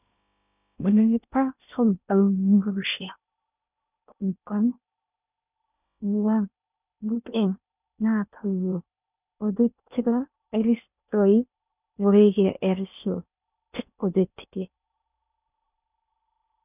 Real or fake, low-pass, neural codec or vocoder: fake; 3.6 kHz; codec, 16 kHz in and 24 kHz out, 0.6 kbps, FocalCodec, streaming, 2048 codes